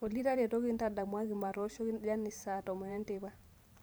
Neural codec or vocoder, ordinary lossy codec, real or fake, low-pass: vocoder, 44.1 kHz, 128 mel bands every 512 samples, BigVGAN v2; none; fake; none